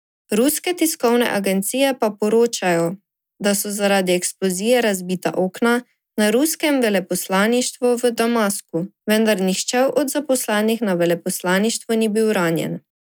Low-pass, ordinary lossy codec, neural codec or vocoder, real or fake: none; none; none; real